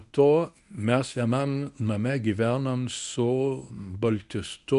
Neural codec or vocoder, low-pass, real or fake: codec, 24 kHz, 0.9 kbps, WavTokenizer, medium speech release version 1; 10.8 kHz; fake